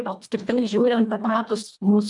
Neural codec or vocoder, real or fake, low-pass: codec, 24 kHz, 1.5 kbps, HILCodec; fake; 10.8 kHz